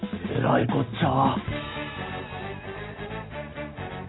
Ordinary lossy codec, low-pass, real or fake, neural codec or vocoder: AAC, 16 kbps; 7.2 kHz; real; none